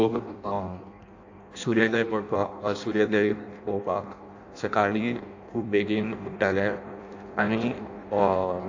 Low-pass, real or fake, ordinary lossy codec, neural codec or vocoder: 7.2 kHz; fake; AAC, 48 kbps; codec, 16 kHz in and 24 kHz out, 0.6 kbps, FireRedTTS-2 codec